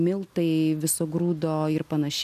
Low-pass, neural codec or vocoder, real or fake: 14.4 kHz; none; real